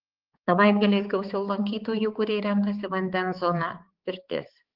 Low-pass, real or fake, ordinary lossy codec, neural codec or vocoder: 5.4 kHz; fake; Opus, 16 kbps; codec, 16 kHz, 4 kbps, X-Codec, HuBERT features, trained on balanced general audio